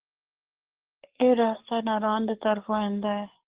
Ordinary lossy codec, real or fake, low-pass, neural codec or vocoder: Opus, 16 kbps; fake; 3.6 kHz; codec, 44.1 kHz, 7.8 kbps, DAC